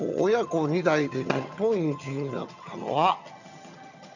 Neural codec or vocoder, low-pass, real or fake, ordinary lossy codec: vocoder, 22.05 kHz, 80 mel bands, HiFi-GAN; 7.2 kHz; fake; none